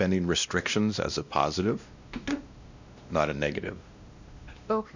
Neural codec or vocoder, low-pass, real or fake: codec, 16 kHz, 1 kbps, X-Codec, WavLM features, trained on Multilingual LibriSpeech; 7.2 kHz; fake